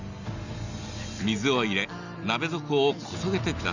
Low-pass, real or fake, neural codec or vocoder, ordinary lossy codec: 7.2 kHz; real; none; none